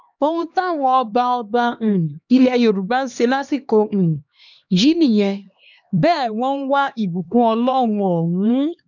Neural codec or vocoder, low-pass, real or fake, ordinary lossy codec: codec, 16 kHz, 2 kbps, X-Codec, HuBERT features, trained on LibriSpeech; 7.2 kHz; fake; none